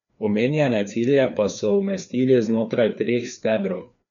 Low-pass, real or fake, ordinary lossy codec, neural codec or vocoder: 7.2 kHz; fake; none; codec, 16 kHz, 2 kbps, FreqCodec, larger model